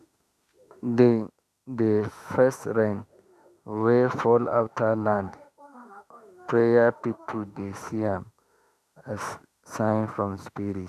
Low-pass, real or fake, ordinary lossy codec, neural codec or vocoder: 14.4 kHz; fake; none; autoencoder, 48 kHz, 32 numbers a frame, DAC-VAE, trained on Japanese speech